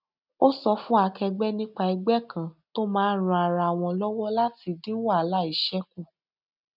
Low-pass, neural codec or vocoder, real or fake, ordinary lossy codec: 5.4 kHz; none; real; none